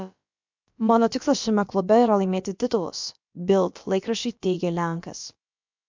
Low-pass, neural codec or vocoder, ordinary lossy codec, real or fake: 7.2 kHz; codec, 16 kHz, about 1 kbps, DyCAST, with the encoder's durations; MP3, 64 kbps; fake